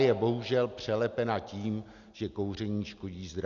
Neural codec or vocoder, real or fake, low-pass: none; real; 7.2 kHz